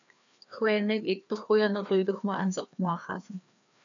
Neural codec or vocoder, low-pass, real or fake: codec, 16 kHz, 2 kbps, FreqCodec, larger model; 7.2 kHz; fake